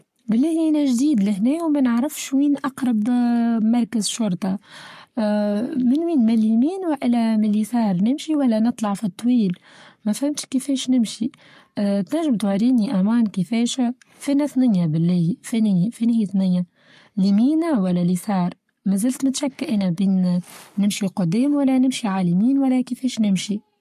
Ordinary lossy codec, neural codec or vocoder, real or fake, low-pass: MP3, 64 kbps; codec, 44.1 kHz, 7.8 kbps, Pupu-Codec; fake; 14.4 kHz